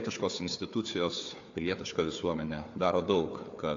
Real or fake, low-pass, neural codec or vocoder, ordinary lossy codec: fake; 7.2 kHz; codec, 16 kHz, 4 kbps, FunCodec, trained on Chinese and English, 50 frames a second; AAC, 48 kbps